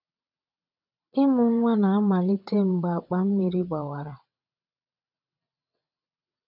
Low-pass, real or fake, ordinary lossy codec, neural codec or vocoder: 5.4 kHz; fake; none; vocoder, 22.05 kHz, 80 mel bands, WaveNeXt